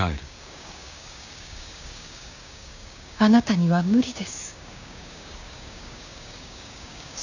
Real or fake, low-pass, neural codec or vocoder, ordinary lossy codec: fake; 7.2 kHz; codec, 16 kHz, 6 kbps, DAC; none